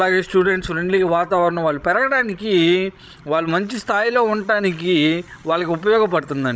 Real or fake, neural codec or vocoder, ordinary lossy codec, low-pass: fake; codec, 16 kHz, 16 kbps, FreqCodec, larger model; none; none